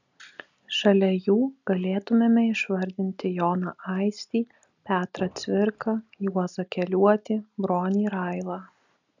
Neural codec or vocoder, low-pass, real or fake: none; 7.2 kHz; real